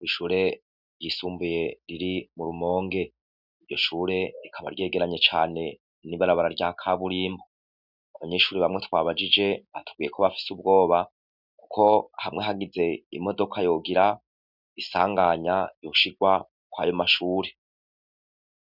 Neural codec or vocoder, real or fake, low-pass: none; real; 5.4 kHz